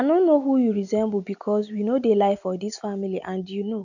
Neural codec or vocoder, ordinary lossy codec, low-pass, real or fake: none; none; 7.2 kHz; real